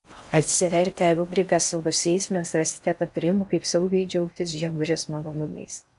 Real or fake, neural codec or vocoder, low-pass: fake; codec, 16 kHz in and 24 kHz out, 0.6 kbps, FocalCodec, streaming, 4096 codes; 10.8 kHz